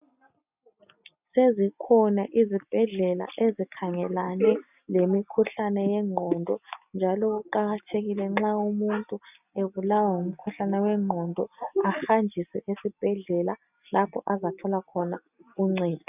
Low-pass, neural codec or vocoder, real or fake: 3.6 kHz; none; real